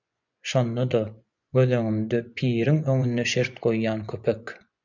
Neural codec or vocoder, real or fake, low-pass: vocoder, 24 kHz, 100 mel bands, Vocos; fake; 7.2 kHz